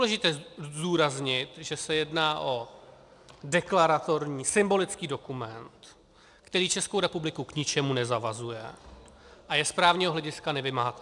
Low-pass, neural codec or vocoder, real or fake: 10.8 kHz; none; real